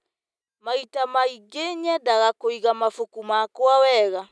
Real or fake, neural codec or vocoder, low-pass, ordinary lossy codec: real; none; none; none